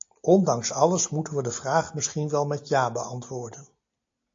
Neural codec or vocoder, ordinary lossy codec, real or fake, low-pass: none; AAC, 48 kbps; real; 7.2 kHz